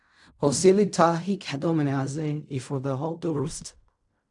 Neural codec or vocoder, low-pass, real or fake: codec, 16 kHz in and 24 kHz out, 0.4 kbps, LongCat-Audio-Codec, fine tuned four codebook decoder; 10.8 kHz; fake